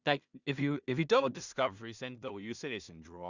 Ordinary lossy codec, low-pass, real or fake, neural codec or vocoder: Opus, 64 kbps; 7.2 kHz; fake; codec, 16 kHz in and 24 kHz out, 0.4 kbps, LongCat-Audio-Codec, two codebook decoder